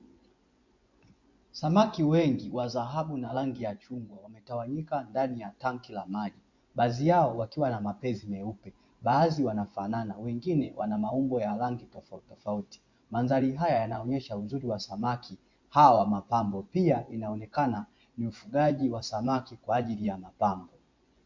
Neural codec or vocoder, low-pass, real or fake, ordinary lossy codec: vocoder, 24 kHz, 100 mel bands, Vocos; 7.2 kHz; fake; MP3, 48 kbps